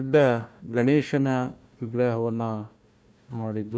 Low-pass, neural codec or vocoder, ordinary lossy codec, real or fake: none; codec, 16 kHz, 1 kbps, FunCodec, trained on Chinese and English, 50 frames a second; none; fake